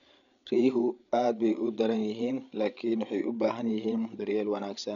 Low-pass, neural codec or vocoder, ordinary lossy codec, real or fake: 7.2 kHz; codec, 16 kHz, 8 kbps, FreqCodec, larger model; none; fake